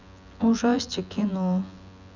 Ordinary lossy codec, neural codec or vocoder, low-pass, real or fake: none; vocoder, 24 kHz, 100 mel bands, Vocos; 7.2 kHz; fake